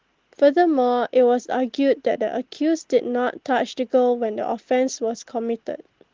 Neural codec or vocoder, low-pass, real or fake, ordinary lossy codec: none; 7.2 kHz; real; Opus, 16 kbps